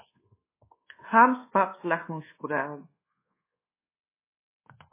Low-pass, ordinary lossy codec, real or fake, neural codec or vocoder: 3.6 kHz; MP3, 16 kbps; fake; codec, 24 kHz, 1.2 kbps, DualCodec